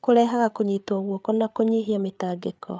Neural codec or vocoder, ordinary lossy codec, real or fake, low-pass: codec, 16 kHz, 8 kbps, FreqCodec, larger model; none; fake; none